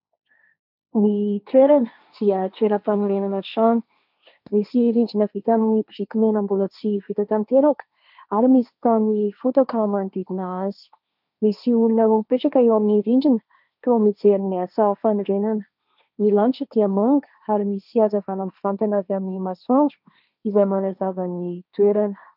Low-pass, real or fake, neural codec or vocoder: 5.4 kHz; fake; codec, 16 kHz, 1.1 kbps, Voila-Tokenizer